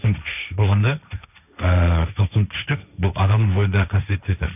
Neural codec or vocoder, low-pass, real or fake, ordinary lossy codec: codec, 16 kHz, 1.1 kbps, Voila-Tokenizer; 3.6 kHz; fake; none